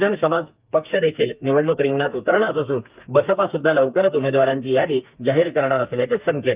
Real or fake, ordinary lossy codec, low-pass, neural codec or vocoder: fake; Opus, 24 kbps; 3.6 kHz; codec, 32 kHz, 1.9 kbps, SNAC